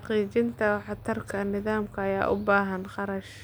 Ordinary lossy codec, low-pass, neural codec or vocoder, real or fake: none; none; none; real